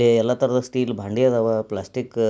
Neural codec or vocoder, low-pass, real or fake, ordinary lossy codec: none; none; real; none